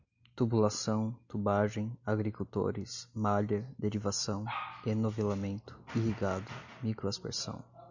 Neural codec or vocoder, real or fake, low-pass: none; real; 7.2 kHz